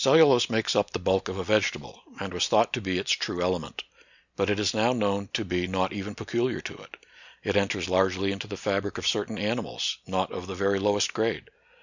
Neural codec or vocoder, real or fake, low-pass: none; real; 7.2 kHz